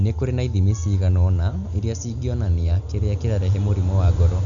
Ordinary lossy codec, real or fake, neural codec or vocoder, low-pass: none; real; none; 7.2 kHz